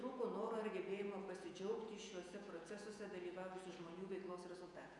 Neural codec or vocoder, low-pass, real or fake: vocoder, 48 kHz, 128 mel bands, Vocos; 10.8 kHz; fake